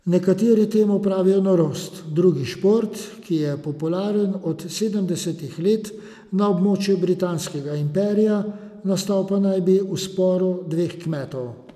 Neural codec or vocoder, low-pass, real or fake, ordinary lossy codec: none; 14.4 kHz; real; none